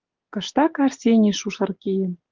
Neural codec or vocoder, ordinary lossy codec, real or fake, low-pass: none; Opus, 32 kbps; real; 7.2 kHz